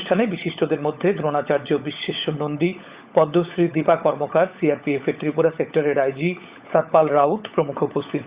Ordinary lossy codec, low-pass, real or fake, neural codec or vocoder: Opus, 64 kbps; 3.6 kHz; fake; codec, 16 kHz, 16 kbps, FunCodec, trained on LibriTTS, 50 frames a second